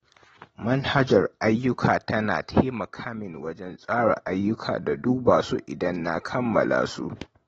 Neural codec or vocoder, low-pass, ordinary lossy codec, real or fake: none; 19.8 kHz; AAC, 24 kbps; real